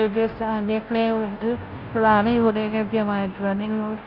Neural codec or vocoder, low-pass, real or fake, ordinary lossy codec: codec, 16 kHz, 0.5 kbps, FunCodec, trained on Chinese and English, 25 frames a second; 5.4 kHz; fake; Opus, 32 kbps